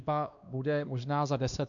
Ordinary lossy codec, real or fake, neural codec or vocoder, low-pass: MP3, 96 kbps; fake; codec, 16 kHz, 2 kbps, X-Codec, WavLM features, trained on Multilingual LibriSpeech; 7.2 kHz